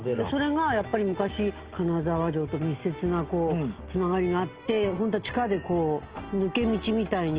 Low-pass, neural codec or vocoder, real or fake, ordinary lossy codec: 3.6 kHz; none; real; Opus, 16 kbps